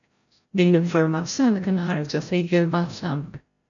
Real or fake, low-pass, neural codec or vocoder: fake; 7.2 kHz; codec, 16 kHz, 0.5 kbps, FreqCodec, larger model